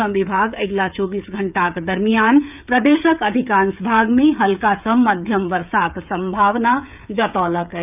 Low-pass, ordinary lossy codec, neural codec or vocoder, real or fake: 3.6 kHz; none; codec, 16 kHz, 16 kbps, FreqCodec, smaller model; fake